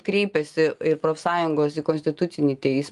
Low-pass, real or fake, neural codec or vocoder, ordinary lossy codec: 10.8 kHz; real; none; Opus, 32 kbps